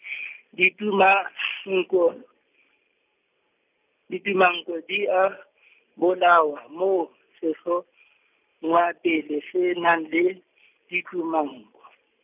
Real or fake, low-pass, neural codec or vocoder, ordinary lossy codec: real; 3.6 kHz; none; none